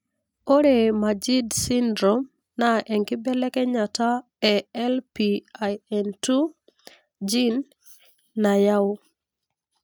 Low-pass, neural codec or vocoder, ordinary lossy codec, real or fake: none; none; none; real